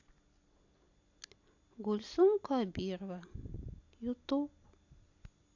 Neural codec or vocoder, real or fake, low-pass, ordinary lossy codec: none; real; 7.2 kHz; AAC, 48 kbps